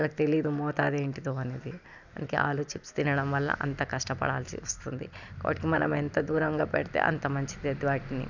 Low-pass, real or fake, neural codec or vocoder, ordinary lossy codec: 7.2 kHz; real; none; none